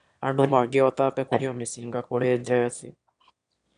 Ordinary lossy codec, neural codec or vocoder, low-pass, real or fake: none; autoencoder, 22.05 kHz, a latent of 192 numbers a frame, VITS, trained on one speaker; 9.9 kHz; fake